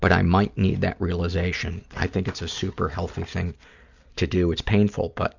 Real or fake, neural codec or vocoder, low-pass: real; none; 7.2 kHz